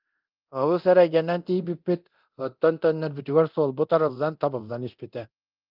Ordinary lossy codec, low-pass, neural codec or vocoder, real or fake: Opus, 24 kbps; 5.4 kHz; codec, 24 kHz, 0.9 kbps, DualCodec; fake